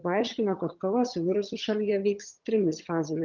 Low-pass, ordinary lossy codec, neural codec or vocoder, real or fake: 7.2 kHz; Opus, 32 kbps; vocoder, 22.05 kHz, 80 mel bands, HiFi-GAN; fake